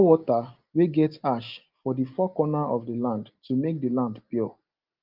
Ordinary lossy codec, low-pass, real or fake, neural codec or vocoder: Opus, 24 kbps; 5.4 kHz; real; none